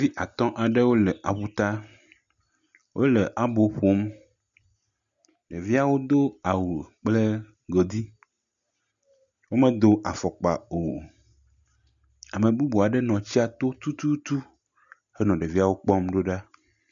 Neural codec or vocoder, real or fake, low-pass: none; real; 7.2 kHz